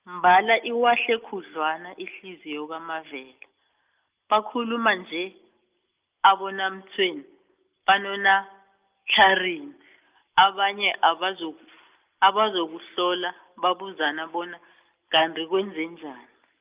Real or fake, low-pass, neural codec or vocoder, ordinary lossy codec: real; 3.6 kHz; none; Opus, 32 kbps